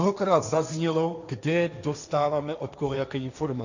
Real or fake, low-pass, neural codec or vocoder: fake; 7.2 kHz; codec, 16 kHz, 1.1 kbps, Voila-Tokenizer